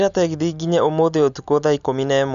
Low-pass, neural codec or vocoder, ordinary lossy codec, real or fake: 7.2 kHz; none; none; real